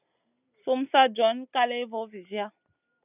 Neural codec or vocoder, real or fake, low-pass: none; real; 3.6 kHz